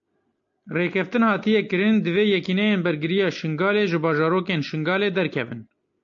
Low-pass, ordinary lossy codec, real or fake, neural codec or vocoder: 7.2 kHz; AAC, 64 kbps; real; none